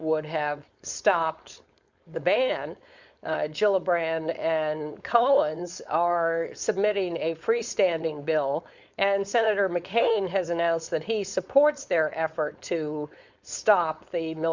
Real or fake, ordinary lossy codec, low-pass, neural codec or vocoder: fake; Opus, 64 kbps; 7.2 kHz; codec, 16 kHz, 4.8 kbps, FACodec